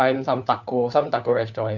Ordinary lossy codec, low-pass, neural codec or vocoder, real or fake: none; 7.2 kHz; codec, 16 kHz, 4 kbps, FunCodec, trained on LibriTTS, 50 frames a second; fake